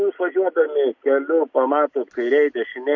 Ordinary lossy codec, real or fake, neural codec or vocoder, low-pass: MP3, 48 kbps; real; none; 7.2 kHz